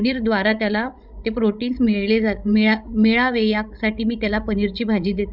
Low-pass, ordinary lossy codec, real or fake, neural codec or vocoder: 5.4 kHz; Opus, 64 kbps; fake; codec, 16 kHz, 16 kbps, FreqCodec, larger model